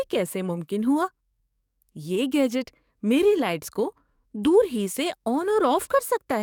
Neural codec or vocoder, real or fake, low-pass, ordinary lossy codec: codec, 44.1 kHz, 7.8 kbps, DAC; fake; 19.8 kHz; none